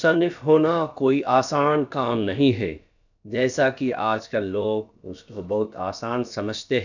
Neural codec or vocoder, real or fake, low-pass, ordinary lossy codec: codec, 16 kHz, about 1 kbps, DyCAST, with the encoder's durations; fake; 7.2 kHz; none